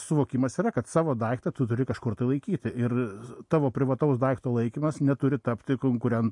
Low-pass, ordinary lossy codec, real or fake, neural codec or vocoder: 10.8 kHz; MP3, 48 kbps; real; none